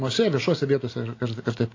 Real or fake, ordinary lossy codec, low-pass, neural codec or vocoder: real; AAC, 32 kbps; 7.2 kHz; none